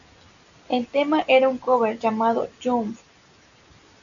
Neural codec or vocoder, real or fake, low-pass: none; real; 7.2 kHz